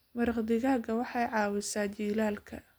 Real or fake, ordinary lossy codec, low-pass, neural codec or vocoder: real; none; none; none